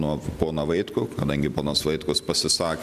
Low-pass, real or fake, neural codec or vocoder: 14.4 kHz; real; none